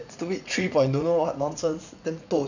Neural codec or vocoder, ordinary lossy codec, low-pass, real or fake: vocoder, 44.1 kHz, 80 mel bands, Vocos; none; 7.2 kHz; fake